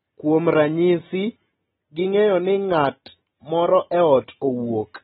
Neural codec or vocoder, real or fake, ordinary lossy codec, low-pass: none; real; AAC, 16 kbps; 19.8 kHz